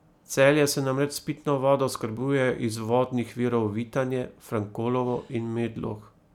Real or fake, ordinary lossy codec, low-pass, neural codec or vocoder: real; none; 19.8 kHz; none